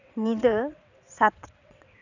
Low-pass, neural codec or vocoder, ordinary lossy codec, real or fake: 7.2 kHz; vocoder, 22.05 kHz, 80 mel bands, WaveNeXt; none; fake